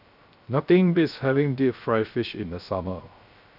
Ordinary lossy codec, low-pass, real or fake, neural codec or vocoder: none; 5.4 kHz; fake; codec, 16 kHz, 0.3 kbps, FocalCodec